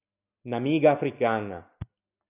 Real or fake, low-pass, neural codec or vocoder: real; 3.6 kHz; none